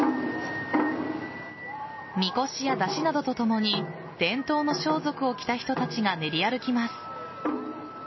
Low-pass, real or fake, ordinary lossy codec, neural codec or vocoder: 7.2 kHz; real; MP3, 24 kbps; none